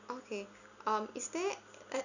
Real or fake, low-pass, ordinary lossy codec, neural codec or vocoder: real; 7.2 kHz; none; none